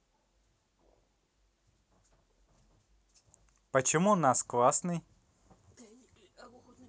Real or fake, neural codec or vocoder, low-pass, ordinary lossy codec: real; none; none; none